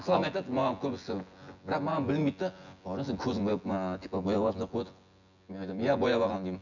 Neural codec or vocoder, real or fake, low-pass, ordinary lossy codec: vocoder, 24 kHz, 100 mel bands, Vocos; fake; 7.2 kHz; none